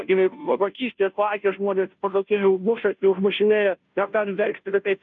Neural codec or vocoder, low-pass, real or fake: codec, 16 kHz, 0.5 kbps, FunCodec, trained on Chinese and English, 25 frames a second; 7.2 kHz; fake